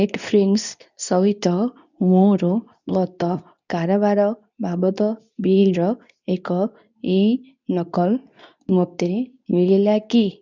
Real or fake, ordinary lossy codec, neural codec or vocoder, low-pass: fake; none; codec, 24 kHz, 0.9 kbps, WavTokenizer, medium speech release version 2; 7.2 kHz